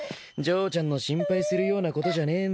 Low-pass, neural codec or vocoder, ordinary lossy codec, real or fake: none; none; none; real